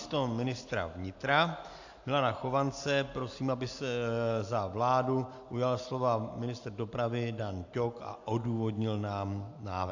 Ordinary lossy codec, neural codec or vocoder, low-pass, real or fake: AAC, 48 kbps; none; 7.2 kHz; real